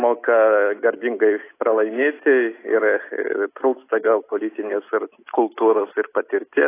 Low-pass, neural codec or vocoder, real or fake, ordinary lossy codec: 3.6 kHz; none; real; AAC, 24 kbps